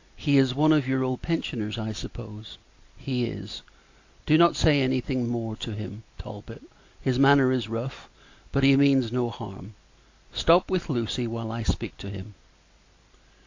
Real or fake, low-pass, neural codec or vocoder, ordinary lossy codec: real; 7.2 kHz; none; AAC, 48 kbps